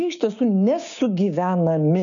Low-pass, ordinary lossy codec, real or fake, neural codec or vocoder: 7.2 kHz; MP3, 96 kbps; fake; codec, 16 kHz, 6 kbps, DAC